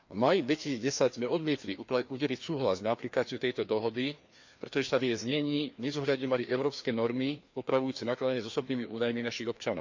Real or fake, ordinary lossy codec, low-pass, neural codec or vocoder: fake; MP3, 64 kbps; 7.2 kHz; codec, 16 kHz, 2 kbps, FreqCodec, larger model